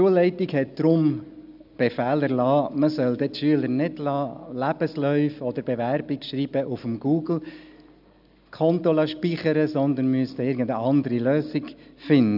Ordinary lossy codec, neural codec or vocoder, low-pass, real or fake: none; none; 5.4 kHz; real